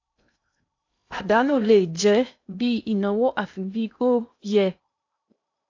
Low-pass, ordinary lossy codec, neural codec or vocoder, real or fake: 7.2 kHz; AAC, 48 kbps; codec, 16 kHz in and 24 kHz out, 0.6 kbps, FocalCodec, streaming, 2048 codes; fake